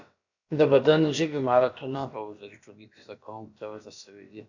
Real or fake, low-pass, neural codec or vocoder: fake; 7.2 kHz; codec, 16 kHz, about 1 kbps, DyCAST, with the encoder's durations